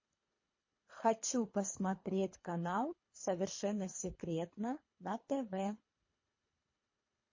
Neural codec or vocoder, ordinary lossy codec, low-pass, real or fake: codec, 24 kHz, 3 kbps, HILCodec; MP3, 32 kbps; 7.2 kHz; fake